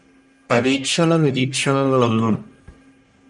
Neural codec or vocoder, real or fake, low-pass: codec, 44.1 kHz, 1.7 kbps, Pupu-Codec; fake; 10.8 kHz